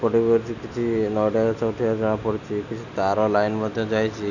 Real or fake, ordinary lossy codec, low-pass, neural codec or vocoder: real; none; 7.2 kHz; none